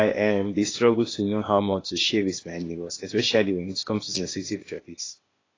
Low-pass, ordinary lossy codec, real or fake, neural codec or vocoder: 7.2 kHz; AAC, 32 kbps; fake; codec, 16 kHz, 0.8 kbps, ZipCodec